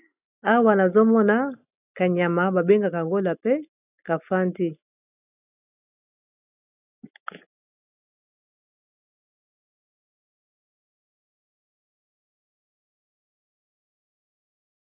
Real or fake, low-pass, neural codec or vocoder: real; 3.6 kHz; none